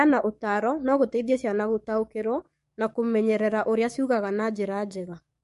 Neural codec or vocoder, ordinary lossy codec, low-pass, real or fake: codec, 44.1 kHz, 7.8 kbps, DAC; MP3, 48 kbps; 14.4 kHz; fake